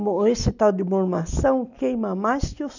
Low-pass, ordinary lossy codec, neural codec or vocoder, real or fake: 7.2 kHz; none; none; real